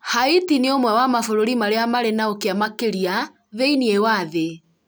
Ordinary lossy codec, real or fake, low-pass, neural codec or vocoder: none; real; none; none